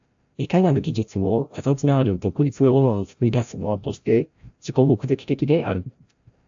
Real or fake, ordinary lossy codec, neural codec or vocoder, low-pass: fake; AAC, 64 kbps; codec, 16 kHz, 0.5 kbps, FreqCodec, larger model; 7.2 kHz